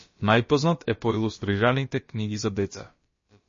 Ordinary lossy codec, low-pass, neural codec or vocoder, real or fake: MP3, 32 kbps; 7.2 kHz; codec, 16 kHz, about 1 kbps, DyCAST, with the encoder's durations; fake